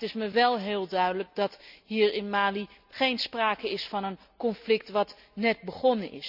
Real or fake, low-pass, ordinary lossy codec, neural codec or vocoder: real; 5.4 kHz; none; none